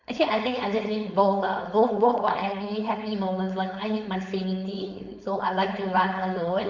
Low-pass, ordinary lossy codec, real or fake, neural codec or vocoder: 7.2 kHz; MP3, 64 kbps; fake; codec, 16 kHz, 4.8 kbps, FACodec